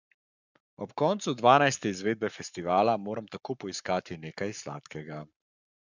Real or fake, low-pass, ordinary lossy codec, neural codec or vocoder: fake; 7.2 kHz; none; codec, 44.1 kHz, 7.8 kbps, Pupu-Codec